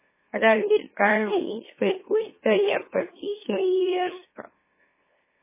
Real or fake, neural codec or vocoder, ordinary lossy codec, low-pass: fake; autoencoder, 44.1 kHz, a latent of 192 numbers a frame, MeloTTS; MP3, 16 kbps; 3.6 kHz